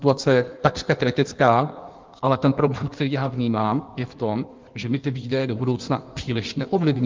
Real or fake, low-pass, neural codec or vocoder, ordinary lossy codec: fake; 7.2 kHz; codec, 16 kHz in and 24 kHz out, 1.1 kbps, FireRedTTS-2 codec; Opus, 32 kbps